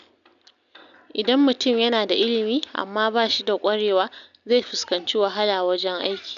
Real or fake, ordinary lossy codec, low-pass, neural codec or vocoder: real; none; 7.2 kHz; none